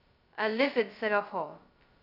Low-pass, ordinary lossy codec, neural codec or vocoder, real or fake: 5.4 kHz; none; codec, 16 kHz, 0.2 kbps, FocalCodec; fake